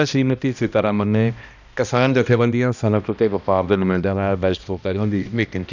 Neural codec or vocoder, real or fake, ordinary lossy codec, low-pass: codec, 16 kHz, 1 kbps, X-Codec, HuBERT features, trained on balanced general audio; fake; none; 7.2 kHz